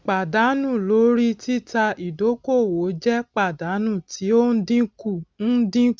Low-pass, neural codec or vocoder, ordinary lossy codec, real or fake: none; none; none; real